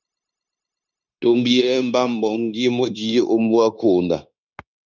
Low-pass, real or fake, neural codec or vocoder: 7.2 kHz; fake; codec, 16 kHz, 0.9 kbps, LongCat-Audio-Codec